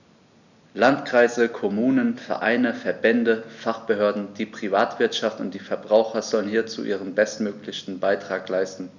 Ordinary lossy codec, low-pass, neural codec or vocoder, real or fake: none; 7.2 kHz; none; real